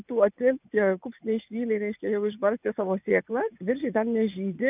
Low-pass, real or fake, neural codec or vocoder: 3.6 kHz; fake; vocoder, 24 kHz, 100 mel bands, Vocos